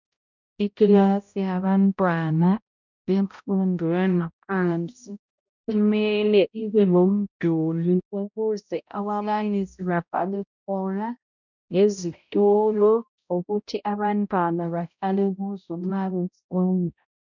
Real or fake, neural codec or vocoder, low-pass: fake; codec, 16 kHz, 0.5 kbps, X-Codec, HuBERT features, trained on balanced general audio; 7.2 kHz